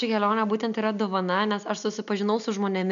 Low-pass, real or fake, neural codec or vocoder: 7.2 kHz; real; none